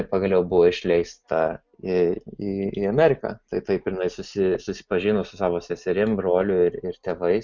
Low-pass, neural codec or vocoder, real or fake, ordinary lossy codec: 7.2 kHz; none; real; Opus, 64 kbps